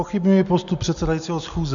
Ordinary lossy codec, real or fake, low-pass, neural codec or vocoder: MP3, 96 kbps; real; 7.2 kHz; none